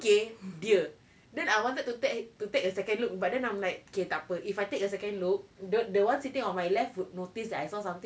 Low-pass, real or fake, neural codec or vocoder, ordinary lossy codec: none; real; none; none